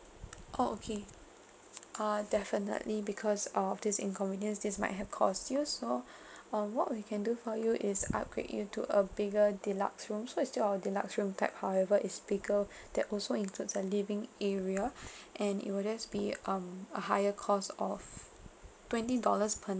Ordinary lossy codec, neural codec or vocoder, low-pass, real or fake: none; none; none; real